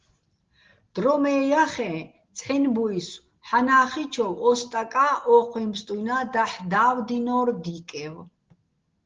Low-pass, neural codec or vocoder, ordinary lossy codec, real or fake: 7.2 kHz; none; Opus, 16 kbps; real